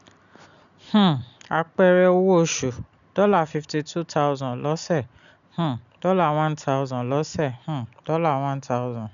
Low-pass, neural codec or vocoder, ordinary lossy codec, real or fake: 7.2 kHz; none; none; real